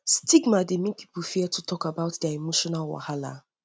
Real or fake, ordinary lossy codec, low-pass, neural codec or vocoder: real; none; none; none